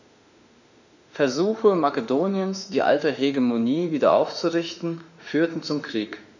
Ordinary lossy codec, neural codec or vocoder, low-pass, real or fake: none; autoencoder, 48 kHz, 32 numbers a frame, DAC-VAE, trained on Japanese speech; 7.2 kHz; fake